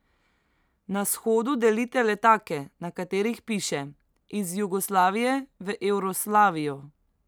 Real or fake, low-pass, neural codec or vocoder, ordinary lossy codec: real; none; none; none